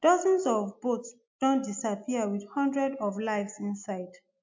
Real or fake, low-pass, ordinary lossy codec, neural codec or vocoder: real; 7.2 kHz; MP3, 64 kbps; none